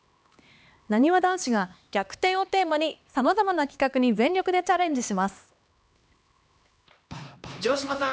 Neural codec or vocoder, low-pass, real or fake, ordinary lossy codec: codec, 16 kHz, 2 kbps, X-Codec, HuBERT features, trained on LibriSpeech; none; fake; none